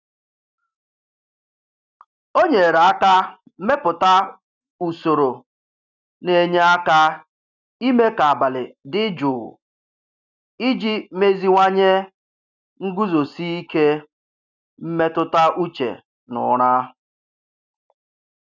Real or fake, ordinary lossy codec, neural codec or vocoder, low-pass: real; none; none; 7.2 kHz